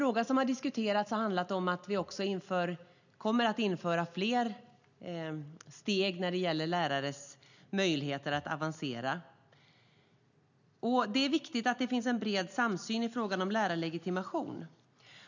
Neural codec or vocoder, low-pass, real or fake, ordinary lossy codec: none; 7.2 kHz; real; none